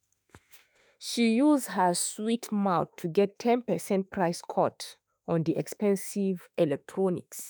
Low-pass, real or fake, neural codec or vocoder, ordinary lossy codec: none; fake; autoencoder, 48 kHz, 32 numbers a frame, DAC-VAE, trained on Japanese speech; none